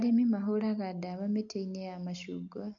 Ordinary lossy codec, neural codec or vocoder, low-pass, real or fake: AAC, 48 kbps; none; 7.2 kHz; real